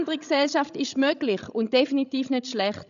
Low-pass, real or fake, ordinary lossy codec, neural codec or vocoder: 7.2 kHz; fake; none; codec, 16 kHz, 16 kbps, FreqCodec, larger model